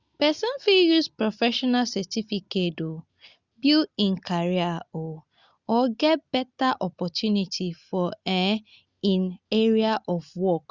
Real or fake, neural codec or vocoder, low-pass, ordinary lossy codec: real; none; 7.2 kHz; Opus, 64 kbps